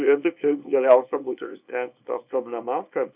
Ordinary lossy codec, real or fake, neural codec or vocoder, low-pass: Opus, 64 kbps; fake; codec, 24 kHz, 0.9 kbps, WavTokenizer, small release; 3.6 kHz